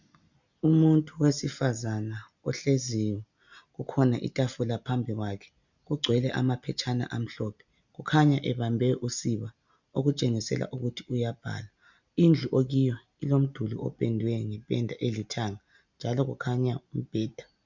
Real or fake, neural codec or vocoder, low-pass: real; none; 7.2 kHz